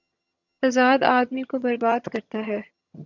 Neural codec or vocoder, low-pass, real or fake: vocoder, 22.05 kHz, 80 mel bands, HiFi-GAN; 7.2 kHz; fake